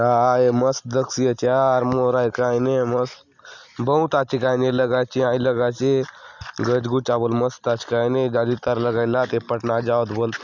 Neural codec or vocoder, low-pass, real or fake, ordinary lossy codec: none; 7.2 kHz; real; none